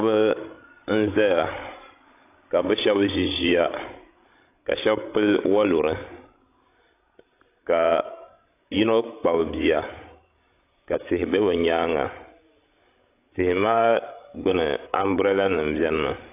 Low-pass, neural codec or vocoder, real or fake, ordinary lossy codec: 3.6 kHz; codec, 16 kHz, 16 kbps, FreqCodec, larger model; fake; AAC, 24 kbps